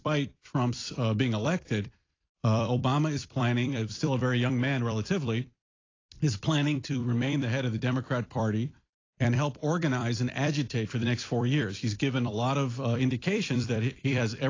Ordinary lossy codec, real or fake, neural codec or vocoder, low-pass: AAC, 32 kbps; fake; vocoder, 44.1 kHz, 128 mel bands every 256 samples, BigVGAN v2; 7.2 kHz